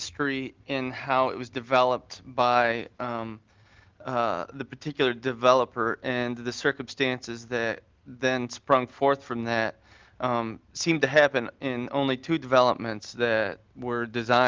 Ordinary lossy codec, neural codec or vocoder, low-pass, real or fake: Opus, 32 kbps; none; 7.2 kHz; real